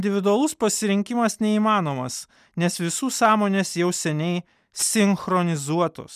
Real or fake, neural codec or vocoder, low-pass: real; none; 14.4 kHz